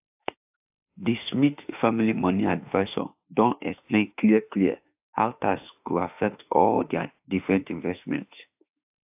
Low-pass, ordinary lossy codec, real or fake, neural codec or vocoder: 3.6 kHz; AAC, 32 kbps; fake; autoencoder, 48 kHz, 32 numbers a frame, DAC-VAE, trained on Japanese speech